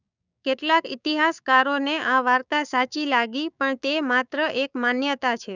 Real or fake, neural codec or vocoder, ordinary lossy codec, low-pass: fake; codec, 16 kHz, 6 kbps, DAC; none; 7.2 kHz